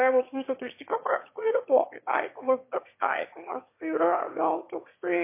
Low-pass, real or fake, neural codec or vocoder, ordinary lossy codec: 3.6 kHz; fake; autoencoder, 22.05 kHz, a latent of 192 numbers a frame, VITS, trained on one speaker; MP3, 24 kbps